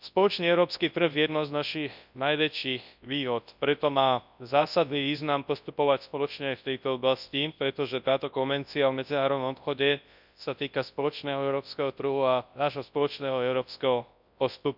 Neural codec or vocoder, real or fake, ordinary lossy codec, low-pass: codec, 24 kHz, 0.9 kbps, WavTokenizer, large speech release; fake; none; 5.4 kHz